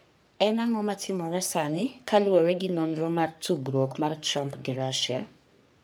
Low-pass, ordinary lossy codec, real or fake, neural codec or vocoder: none; none; fake; codec, 44.1 kHz, 3.4 kbps, Pupu-Codec